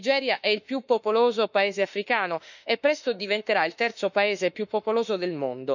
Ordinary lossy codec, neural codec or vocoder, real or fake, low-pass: none; autoencoder, 48 kHz, 32 numbers a frame, DAC-VAE, trained on Japanese speech; fake; 7.2 kHz